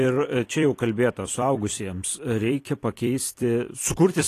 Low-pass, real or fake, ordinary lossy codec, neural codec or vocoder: 14.4 kHz; fake; AAC, 48 kbps; vocoder, 44.1 kHz, 128 mel bands every 256 samples, BigVGAN v2